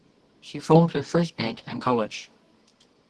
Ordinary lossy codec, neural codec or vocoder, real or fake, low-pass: Opus, 16 kbps; codec, 24 kHz, 0.9 kbps, WavTokenizer, medium music audio release; fake; 10.8 kHz